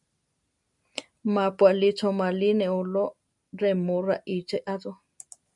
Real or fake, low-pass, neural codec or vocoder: real; 10.8 kHz; none